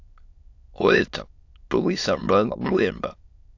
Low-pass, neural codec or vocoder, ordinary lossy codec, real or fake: 7.2 kHz; autoencoder, 22.05 kHz, a latent of 192 numbers a frame, VITS, trained on many speakers; AAC, 48 kbps; fake